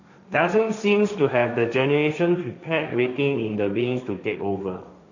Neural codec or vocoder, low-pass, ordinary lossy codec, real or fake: codec, 16 kHz, 1.1 kbps, Voila-Tokenizer; 7.2 kHz; none; fake